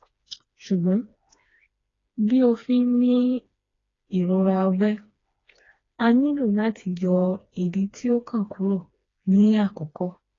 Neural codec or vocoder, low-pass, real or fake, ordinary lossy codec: codec, 16 kHz, 2 kbps, FreqCodec, smaller model; 7.2 kHz; fake; AAC, 32 kbps